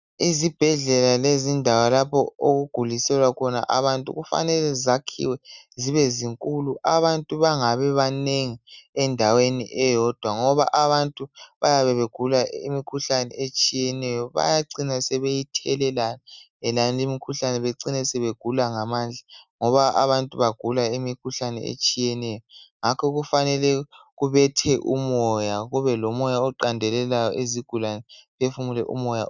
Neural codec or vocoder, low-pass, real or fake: none; 7.2 kHz; real